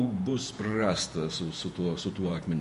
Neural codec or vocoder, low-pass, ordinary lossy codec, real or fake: vocoder, 48 kHz, 128 mel bands, Vocos; 14.4 kHz; MP3, 48 kbps; fake